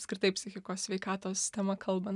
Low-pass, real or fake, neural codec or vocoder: 10.8 kHz; real; none